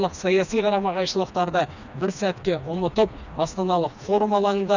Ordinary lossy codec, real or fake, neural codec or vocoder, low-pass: none; fake; codec, 16 kHz, 2 kbps, FreqCodec, smaller model; 7.2 kHz